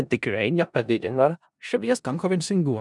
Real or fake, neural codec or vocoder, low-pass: fake; codec, 16 kHz in and 24 kHz out, 0.4 kbps, LongCat-Audio-Codec, four codebook decoder; 10.8 kHz